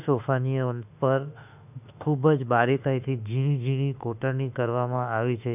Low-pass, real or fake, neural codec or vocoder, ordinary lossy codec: 3.6 kHz; fake; autoencoder, 48 kHz, 32 numbers a frame, DAC-VAE, trained on Japanese speech; none